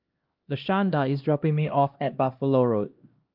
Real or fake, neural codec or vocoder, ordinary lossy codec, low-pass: fake; codec, 16 kHz, 1 kbps, X-Codec, HuBERT features, trained on LibriSpeech; Opus, 32 kbps; 5.4 kHz